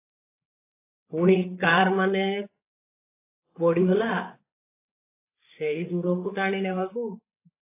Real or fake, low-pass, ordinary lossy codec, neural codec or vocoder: fake; 3.6 kHz; AAC, 24 kbps; codec, 16 kHz, 8 kbps, FreqCodec, larger model